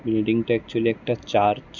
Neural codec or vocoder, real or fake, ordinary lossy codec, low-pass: none; real; none; 7.2 kHz